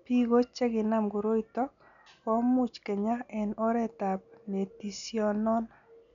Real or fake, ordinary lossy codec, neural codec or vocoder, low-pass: real; none; none; 7.2 kHz